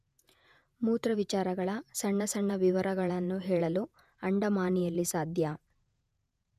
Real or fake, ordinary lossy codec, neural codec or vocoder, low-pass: fake; none; vocoder, 48 kHz, 128 mel bands, Vocos; 14.4 kHz